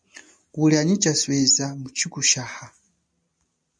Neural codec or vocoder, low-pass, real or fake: none; 9.9 kHz; real